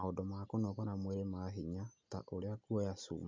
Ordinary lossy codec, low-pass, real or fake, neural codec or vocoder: MP3, 64 kbps; 7.2 kHz; real; none